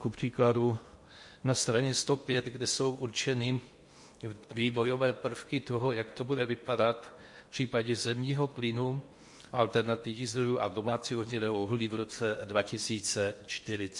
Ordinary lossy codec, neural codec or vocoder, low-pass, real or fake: MP3, 48 kbps; codec, 16 kHz in and 24 kHz out, 0.8 kbps, FocalCodec, streaming, 65536 codes; 10.8 kHz; fake